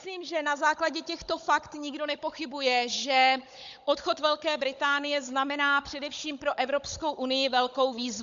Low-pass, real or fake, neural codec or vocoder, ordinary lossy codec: 7.2 kHz; fake; codec, 16 kHz, 16 kbps, FunCodec, trained on Chinese and English, 50 frames a second; MP3, 64 kbps